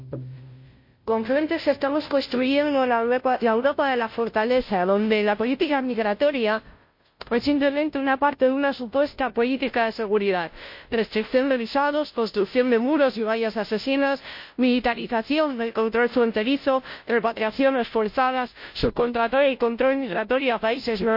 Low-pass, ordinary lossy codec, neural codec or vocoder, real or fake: 5.4 kHz; MP3, 32 kbps; codec, 16 kHz, 0.5 kbps, FunCodec, trained on Chinese and English, 25 frames a second; fake